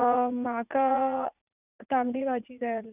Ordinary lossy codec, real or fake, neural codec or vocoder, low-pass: none; fake; vocoder, 44.1 kHz, 80 mel bands, Vocos; 3.6 kHz